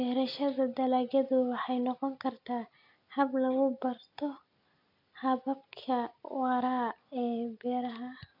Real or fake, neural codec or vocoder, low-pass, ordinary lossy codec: real; none; 5.4 kHz; none